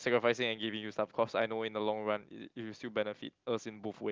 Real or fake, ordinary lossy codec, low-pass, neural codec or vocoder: real; Opus, 32 kbps; 7.2 kHz; none